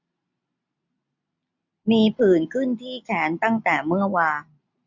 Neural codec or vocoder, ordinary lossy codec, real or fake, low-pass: none; none; real; 7.2 kHz